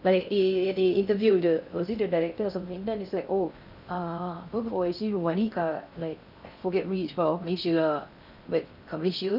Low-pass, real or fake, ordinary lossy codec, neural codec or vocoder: 5.4 kHz; fake; none; codec, 16 kHz in and 24 kHz out, 0.6 kbps, FocalCodec, streaming, 2048 codes